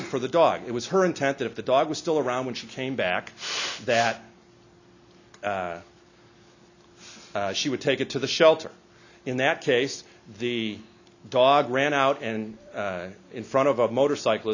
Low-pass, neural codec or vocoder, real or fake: 7.2 kHz; none; real